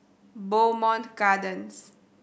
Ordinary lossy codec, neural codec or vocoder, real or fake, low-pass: none; none; real; none